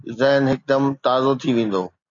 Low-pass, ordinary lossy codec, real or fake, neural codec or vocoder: 7.2 kHz; AAC, 48 kbps; real; none